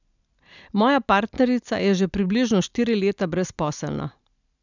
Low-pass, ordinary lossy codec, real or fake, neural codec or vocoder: 7.2 kHz; none; real; none